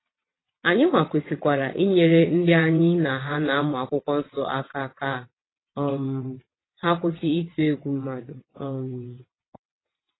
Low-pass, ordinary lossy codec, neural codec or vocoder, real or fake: 7.2 kHz; AAC, 16 kbps; vocoder, 22.05 kHz, 80 mel bands, WaveNeXt; fake